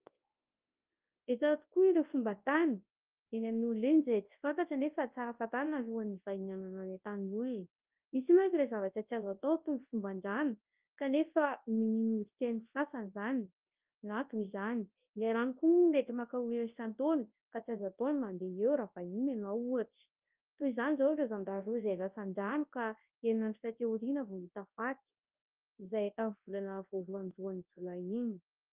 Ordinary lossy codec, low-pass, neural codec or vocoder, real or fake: Opus, 32 kbps; 3.6 kHz; codec, 24 kHz, 0.9 kbps, WavTokenizer, large speech release; fake